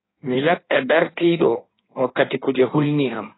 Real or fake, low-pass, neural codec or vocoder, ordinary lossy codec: fake; 7.2 kHz; codec, 16 kHz in and 24 kHz out, 1.1 kbps, FireRedTTS-2 codec; AAC, 16 kbps